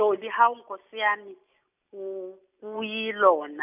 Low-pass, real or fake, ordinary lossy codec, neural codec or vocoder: 3.6 kHz; real; none; none